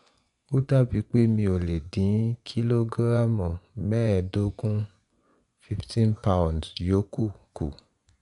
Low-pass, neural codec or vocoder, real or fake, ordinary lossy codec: 10.8 kHz; vocoder, 24 kHz, 100 mel bands, Vocos; fake; none